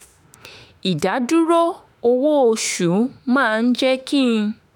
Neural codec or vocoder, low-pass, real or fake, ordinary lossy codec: autoencoder, 48 kHz, 32 numbers a frame, DAC-VAE, trained on Japanese speech; none; fake; none